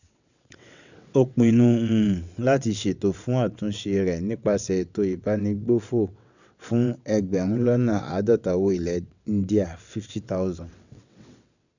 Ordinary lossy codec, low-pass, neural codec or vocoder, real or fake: none; 7.2 kHz; vocoder, 22.05 kHz, 80 mel bands, WaveNeXt; fake